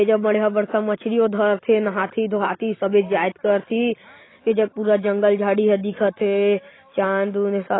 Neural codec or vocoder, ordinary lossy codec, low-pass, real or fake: none; AAC, 16 kbps; 7.2 kHz; real